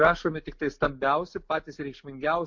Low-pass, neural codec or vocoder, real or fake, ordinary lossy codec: 7.2 kHz; none; real; MP3, 48 kbps